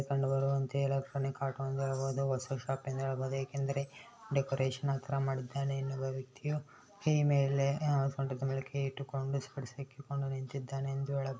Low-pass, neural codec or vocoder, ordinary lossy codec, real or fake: none; none; none; real